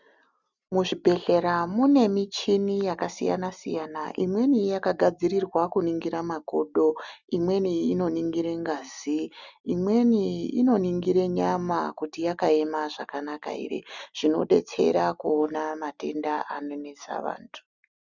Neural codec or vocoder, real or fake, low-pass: none; real; 7.2 kHz